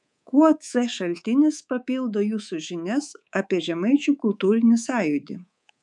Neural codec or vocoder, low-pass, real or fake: codec, 24 kHz, 3.1 kbps, DualCodec; 10.8 kHz; fake